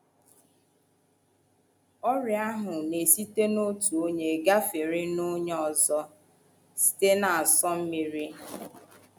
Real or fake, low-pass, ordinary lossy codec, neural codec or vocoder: real; none; none; none